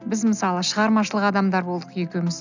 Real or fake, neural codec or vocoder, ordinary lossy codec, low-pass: real; none; none; 7.2 kHz